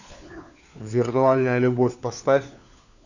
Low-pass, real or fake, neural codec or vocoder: 7.2 kHz; fake; codec, 16 kHz, 4 kbps, FunCodec, trained on LibriTTS, 50 frames a second